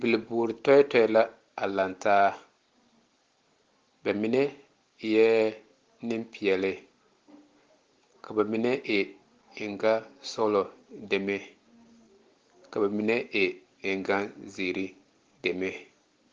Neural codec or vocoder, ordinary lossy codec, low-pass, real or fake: none; Opus, 16 kbps; 7.2 kHz; real